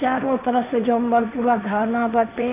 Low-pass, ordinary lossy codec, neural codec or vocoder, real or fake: 3.6 kHz; none; codec, 16 kHz, 1.1 kbps, Voila-Tokenizer; fake